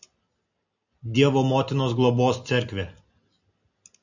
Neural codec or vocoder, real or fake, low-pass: none; real; 7.2 kHz